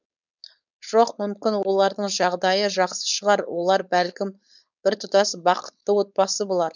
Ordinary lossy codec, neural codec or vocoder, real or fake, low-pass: none; codec, 16 kHz, 4.8 kbps, FACodec; fake; 7.2 kHz